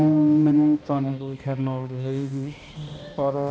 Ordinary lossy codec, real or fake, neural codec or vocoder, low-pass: none; fake; codec, 16 kHz, 1 kbps, X-Codec, HuBERT features, trained on balanced general audio; none